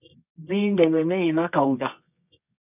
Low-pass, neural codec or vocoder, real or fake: 3.6 kHz; codec, 24 kHz, 0.9 kbps, WavTokenizer, medium music audio release; fake